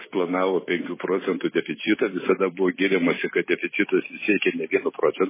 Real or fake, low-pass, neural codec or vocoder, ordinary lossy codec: fake; 3.6 kHz; vocoder, 44.1 kHz, 128 mel bands every 256 samples, BigVGAN v2; MP3, 16 kbps